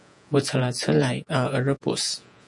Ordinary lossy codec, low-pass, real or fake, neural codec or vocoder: MP3, 96 kbps; 10.8 kHz; fake; vocoder, 48 kHz, 128 mel bands, Vocos